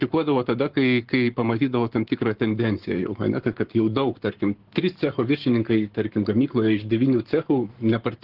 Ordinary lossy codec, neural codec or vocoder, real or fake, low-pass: Opus, 16 kbps; codec, 44.1 kHz, 7.8 kbps, Pupu-Codec; fake; 5.4 kHz